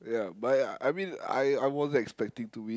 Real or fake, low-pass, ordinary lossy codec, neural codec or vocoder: real; none; none; none